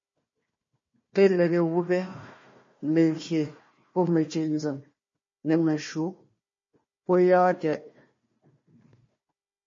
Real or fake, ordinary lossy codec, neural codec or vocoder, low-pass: fake; MP3, 32 kbps; codec, 16 kHz, 1 kbps, FunCodec, trained on Chinese and English, 50 frames a second; 7.2 kHz